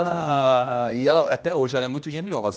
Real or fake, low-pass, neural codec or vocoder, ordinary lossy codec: fake; none; codec, 16 kHz, 1 kbps, X-Codec, HuBERT features, trained on general audio; none